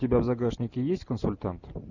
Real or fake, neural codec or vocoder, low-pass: real; none; 7.2 kHz